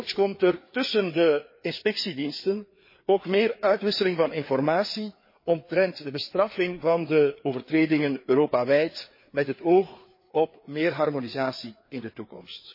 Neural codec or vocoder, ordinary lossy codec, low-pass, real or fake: codec, 16 kHz, 4 kbps, FreqCodec, larger model; MP3, 24 kbps; 5.4 kHz; fake